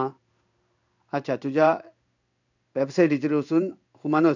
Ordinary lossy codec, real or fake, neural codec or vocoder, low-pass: none; fake; codec, 16 kHz in and 24 kHz out, 1 kbps, XY-Tokenizer; 7.2 kHz